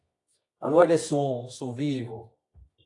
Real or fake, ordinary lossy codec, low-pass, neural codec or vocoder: fake; AAC, 48 kbps; 10.8 kHz; codec, 24 kHz, 0.9 kbps, WavTokenizer, medium music audio release